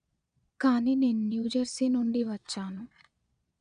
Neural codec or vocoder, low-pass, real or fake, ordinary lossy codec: vocoder, 22.05 kHz, 80 mel bands, Vocos; 9.9 kHz; fake; none